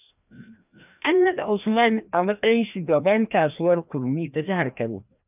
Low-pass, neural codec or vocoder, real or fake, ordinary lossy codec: 3.6 kHz; codec, 16 kHz, 1 kbps, FreqCodec, larger model; fake; none